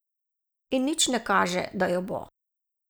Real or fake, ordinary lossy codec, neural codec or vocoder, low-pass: real; none; none; none